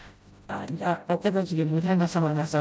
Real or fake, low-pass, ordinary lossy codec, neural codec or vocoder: fake; none; none; codec, 16 kHz, 0.5 kbps, FreqCodec, smaller model